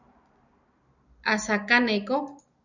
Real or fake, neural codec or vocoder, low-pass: real; none; 7.2 kHz